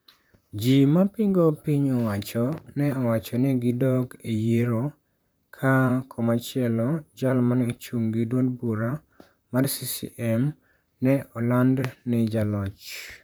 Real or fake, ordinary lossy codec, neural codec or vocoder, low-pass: fake; none; vocoder, 44.1 kHz, 128 mel bands, Pupu-Vocoder; none